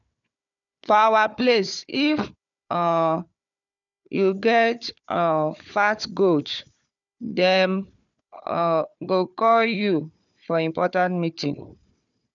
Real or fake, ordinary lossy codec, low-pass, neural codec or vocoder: fake; none; 7.2 kHz; codec, 16 kHz, 4 kbps, FunCodec, trained on Chinese and English, 50 frames a second